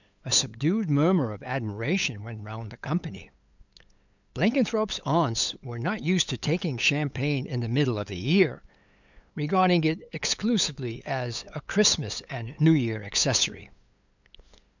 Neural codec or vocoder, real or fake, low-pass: codec, 16 kHz, 8 kbps, FunCodec, trained on LibriTTS, 25 frames a second; fake; 7.2 kHz